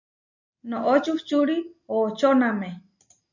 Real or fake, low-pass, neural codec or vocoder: real; 7.2 kHz; none